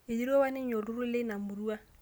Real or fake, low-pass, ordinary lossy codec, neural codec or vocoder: real; none; none; none